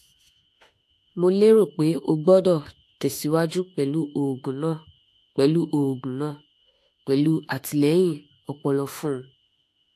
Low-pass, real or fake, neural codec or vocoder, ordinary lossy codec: 14.4 kHz; fake; autoencoder, 48 kHz, 32 numbers a frame, DAC-VAE, trained on Japanese speech; AAC, 64 kbps